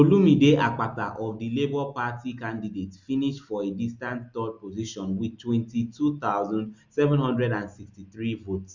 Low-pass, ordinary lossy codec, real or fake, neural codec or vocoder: 7.2 kHz; Opus, 64 kbps; real; none